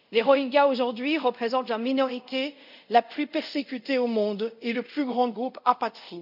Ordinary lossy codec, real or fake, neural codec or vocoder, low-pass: none; fake; codec, 24 kHz, 0.5 kbps, DualCodec; 5.4 kHz